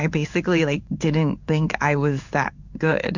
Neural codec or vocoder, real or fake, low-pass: codec, 16 kHz in and 24 kHz out, 1 kbps, XY-Tokenizer; fake; 7.2 kHz